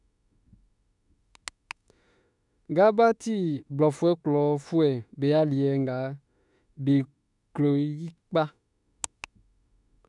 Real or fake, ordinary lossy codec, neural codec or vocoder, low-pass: fake; none; autoencoder, 48 kHz, 32 numbers a frame, DAC-VAE, trained on Japanese speech; 10.8 kHz